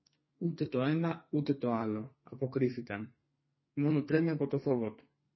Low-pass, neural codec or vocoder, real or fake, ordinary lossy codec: 7.2 kHz; codec, 32 kHz, 1.9 kbps, SNAC; fake; MP3, 24 kbps